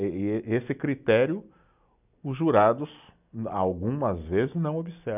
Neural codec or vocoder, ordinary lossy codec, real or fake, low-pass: none; none; real; 3.6 kHz